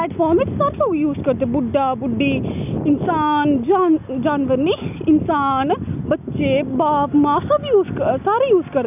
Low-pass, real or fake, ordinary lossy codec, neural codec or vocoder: 3.6 kHz; real; none; none